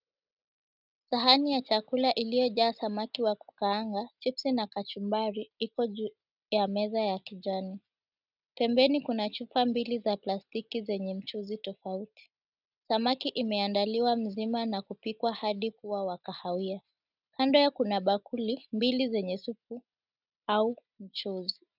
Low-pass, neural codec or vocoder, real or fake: 5.4 kHz; none; real